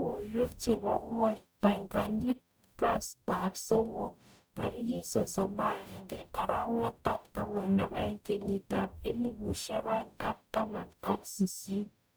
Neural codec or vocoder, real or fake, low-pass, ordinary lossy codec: codec, 44.1 kHz, 0.9 kbps, DAC; fake; none; none